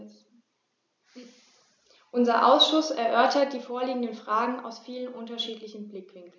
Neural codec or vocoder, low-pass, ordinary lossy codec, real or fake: none; none; none; real